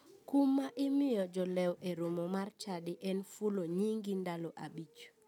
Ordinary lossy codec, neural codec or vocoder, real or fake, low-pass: none; none; real; 19.8 kHz